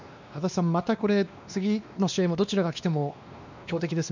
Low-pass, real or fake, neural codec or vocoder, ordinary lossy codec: 7.2 kHz; fake; codec, 16 kHz, 2 kbps, X-Codec, WavLM features, trained on Multilingual LibriSpeech; none